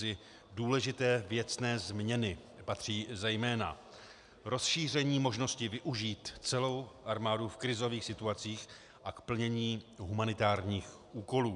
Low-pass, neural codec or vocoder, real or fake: 10.8 kHz; none; real